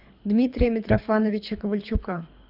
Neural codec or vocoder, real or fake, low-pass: codec, 24 kHz, 3 kbps, HILCodec; fake; 5.4 kHz